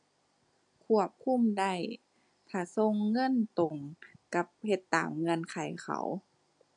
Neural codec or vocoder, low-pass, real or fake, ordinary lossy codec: none; 9.9 kHz; real; none